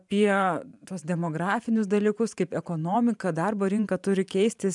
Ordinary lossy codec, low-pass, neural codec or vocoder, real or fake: MP3, 96 kbps; 10.8 kHz; vocoder, 44.1 kHz, 128 mel bands every 512 samples, BigVGAN v2; fake